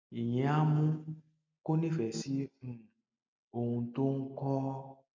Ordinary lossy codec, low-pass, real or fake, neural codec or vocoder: MP3, 64 kbps; 7.2 kHz; real; none